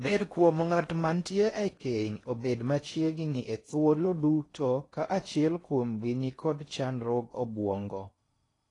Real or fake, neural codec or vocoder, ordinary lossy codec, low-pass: fake; codec, 16 kHz in and 24 kHz out, 0.6 kbps, FocalCodec, streaming, 4096 codes; AAC, 32 kbps; 10.8 kHz